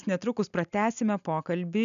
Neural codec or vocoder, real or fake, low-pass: none; real; 7.2 kHz